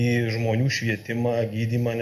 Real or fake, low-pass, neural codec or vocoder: real; 14.4 kHz; none